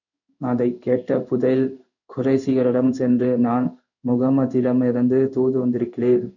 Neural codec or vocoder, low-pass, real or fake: codec, 16 kHz in and 24 kHz out, 1 kbps, XY-Tokenizer; 7.2 kHz; fake